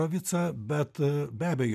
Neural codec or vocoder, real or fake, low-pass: none; real; 14.4 kHz